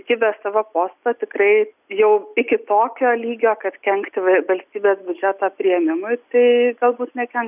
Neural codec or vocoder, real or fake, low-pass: none; real; 3.6 kHz